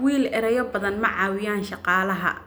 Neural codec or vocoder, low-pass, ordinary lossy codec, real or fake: none; none; none; real